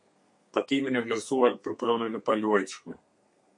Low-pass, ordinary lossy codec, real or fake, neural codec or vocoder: 10.8 kHz; MP3, 48 kbps; fake; codec, 32 kHz, 1.9 kbps, SNAC